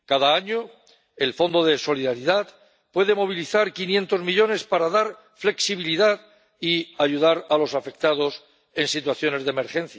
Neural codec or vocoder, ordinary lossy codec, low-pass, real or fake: none; none; none; real